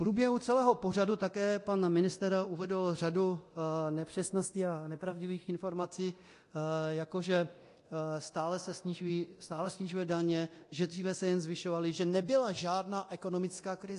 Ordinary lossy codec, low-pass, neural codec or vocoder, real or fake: AAC, 48 kbps; 10.8 kHz; codec, 24 kHz, 0.9 kbps, DualCodec; fake